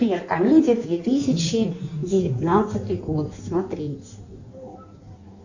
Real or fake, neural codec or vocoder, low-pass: fake; codec, 16 kHz in and 24 kHz out, 1.1 kbps, FireRedTTS-2 codec; 7.2 kHz